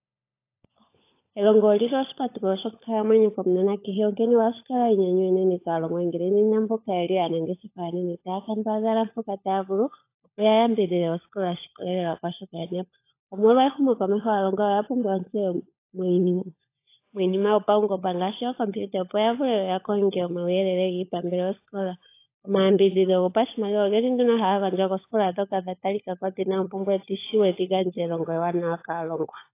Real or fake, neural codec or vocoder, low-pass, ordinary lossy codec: fake; codec, 16 kHz, 16 kbps, FunCodec, trained on LibriTTS, 50 frames a second; 3.6 kHz; AAC, 24 kbps